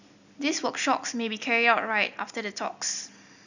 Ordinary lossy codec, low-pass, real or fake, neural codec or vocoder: none; 7.2 kHz; real; none